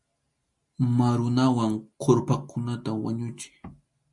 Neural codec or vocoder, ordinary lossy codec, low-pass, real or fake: none; MP3, 48 kbps; 10.8 kHz; real